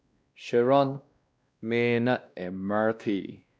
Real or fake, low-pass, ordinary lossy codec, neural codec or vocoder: fake; none; none; codec, 16 kHz, 1 kbps, X-Codec, WavLM features, trained on Multilingual LibriSpeech